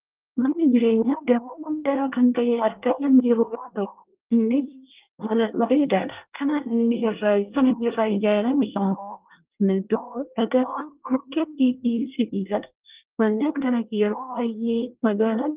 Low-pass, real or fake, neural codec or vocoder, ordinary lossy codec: 3.6 kHz; fake; codec, 24 kHz, 1 kbps, SNAC; Opus, 32 kbps